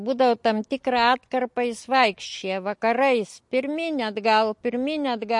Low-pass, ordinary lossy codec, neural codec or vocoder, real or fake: 10.8 kHz; MP3, 48 kbps; none; real